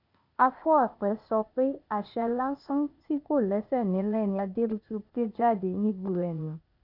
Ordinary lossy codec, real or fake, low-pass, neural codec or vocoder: none; fake; 5.4 kHz; codec, 16 kHz, 0.8 kbps, ZipCodec